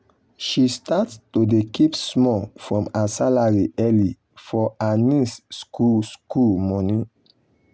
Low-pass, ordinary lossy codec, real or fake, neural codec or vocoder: none; none; real; none